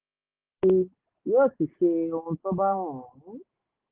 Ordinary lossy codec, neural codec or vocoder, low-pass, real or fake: Opus, 16 kbps; none; 3.6 kHz; real